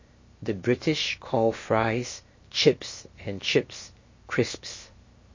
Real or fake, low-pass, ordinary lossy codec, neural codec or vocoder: fake; 7.2 kHz; MP3, 32 kbps; codec, 16 kHz, 0.7 kbps, FocalCodec